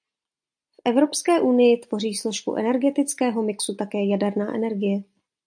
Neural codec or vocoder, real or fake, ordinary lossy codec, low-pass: none; real; AAC, 64 kbps; 9.9 kHz